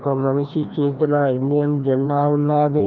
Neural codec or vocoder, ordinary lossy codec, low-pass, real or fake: codec, 16 kHz, 1 kbps, FreqCodec, larger model; Opus, 24 kbps; 7.2 kHz; fake